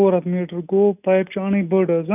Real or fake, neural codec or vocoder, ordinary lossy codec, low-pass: real; none; none; 3.6 kHz